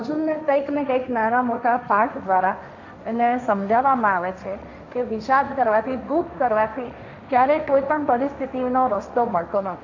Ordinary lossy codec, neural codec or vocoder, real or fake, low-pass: none; codec, 16 kHz, 1.1 kbps, Voila-Tokenizer; fake; 7.2 kHz